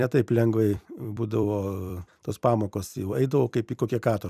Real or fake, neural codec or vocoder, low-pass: fake; vocoder, 44.1 kHz, 128 mel bands every 256 samples, BigVGAN v2; 14.4 kHz